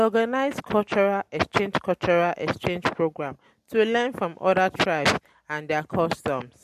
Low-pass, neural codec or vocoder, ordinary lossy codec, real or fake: 14.4 kHz; none; MP3, 64 kbps; real